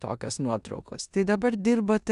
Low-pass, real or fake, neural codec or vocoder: 10.8 kHz; fake; codec, 16 kHz in and 24 kHz out, 0.9 kbps, LongCat-Audio-Codec, four codebook decoder